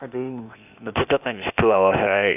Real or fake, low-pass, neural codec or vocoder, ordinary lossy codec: fake; 3.6 kHz; codec, 16 kHz, 0.8 kbps, ZipCodec; none